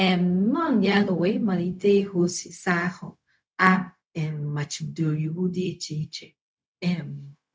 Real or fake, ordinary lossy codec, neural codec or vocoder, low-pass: fake; none; codec, 16 kHz, 0.4 kbps, LongCat-Audio-Codec; none